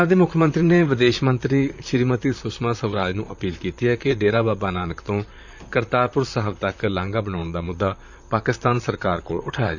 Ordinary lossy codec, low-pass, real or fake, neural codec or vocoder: none; 7.2 kHz; fake; vocoder, 44.1 kHz, 128 mel bands, Pupu-Vocoder